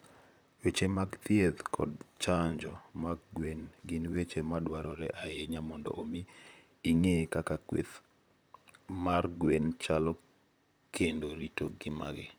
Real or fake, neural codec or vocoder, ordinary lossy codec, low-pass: fake; vocoder, 44.1 kHz, 128 mel bands, Pupu-Vocoder; none; none